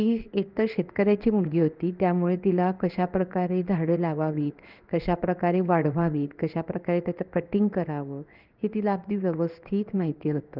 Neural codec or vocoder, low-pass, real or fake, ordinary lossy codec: codec, 16 kHz in and 24 kHz out, 1 kbps, XY-Tokenizer; 5.4 kHz; fake; Opus, 24 kbps